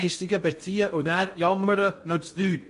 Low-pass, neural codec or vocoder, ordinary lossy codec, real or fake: 10.8 kHz; codec, 16 kHz in and 24 kHz out, 0.6 kbps, FocalCodec, streaming, 2048 codes; MP3, 48 kbps; fake